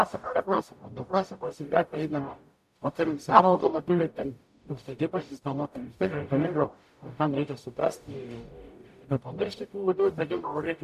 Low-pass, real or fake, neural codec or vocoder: 14.4 kHz; fake; codec, 44.1 kHz, 0.9 kbps, DAC